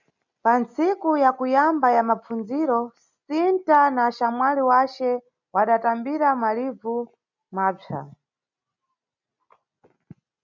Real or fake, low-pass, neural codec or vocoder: real; 7.2 kHz; none